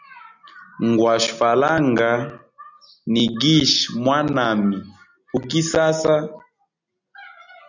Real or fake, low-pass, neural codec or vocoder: real; 7.2 kHz; none